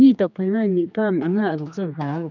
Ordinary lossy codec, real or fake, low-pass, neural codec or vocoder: none; fake; 7.2 kHz; codec, 16 kHz, 2 kbps, X-Codec, HuBERT features, trained on general audio